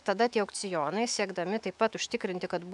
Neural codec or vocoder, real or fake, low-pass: autoencoder, 48 kHz, 128 numbers a frame, DAC-VAE, trained on Japanese speech; fake; 10.8 kHz